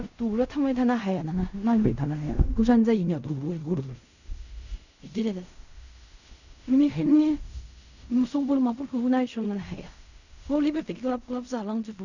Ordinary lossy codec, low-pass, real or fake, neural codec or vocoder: none; 7.2 kHz; fake; codec, 16 kHz in and 24 kHz out, 0.4 kbps, LongCat-Audio-Codec, fine tuned four codebook decoder